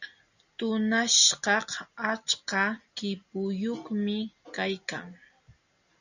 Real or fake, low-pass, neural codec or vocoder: real; 7.2 kHz; none